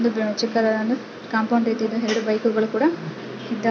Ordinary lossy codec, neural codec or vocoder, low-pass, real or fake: none; none; none; real